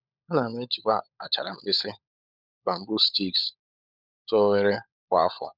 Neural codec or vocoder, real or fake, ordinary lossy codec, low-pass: codec, 16 kHz, 16 kbps, FunCodec, trained on LibriTTS, 50 frames a second; fake; none; 5.4 kHz